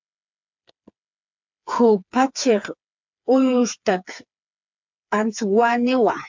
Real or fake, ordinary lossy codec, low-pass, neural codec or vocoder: fake; MP3, 64 kbps; 7.2 kHz; codec, 16 kHz, 4 kbps, FreqCodec, smaller model